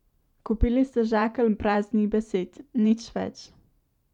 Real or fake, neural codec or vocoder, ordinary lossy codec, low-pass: fake; vocoder, 44.1 kHz, 128 mel bands every 512 samples, BigVGAN v2; none; 19.8 kHz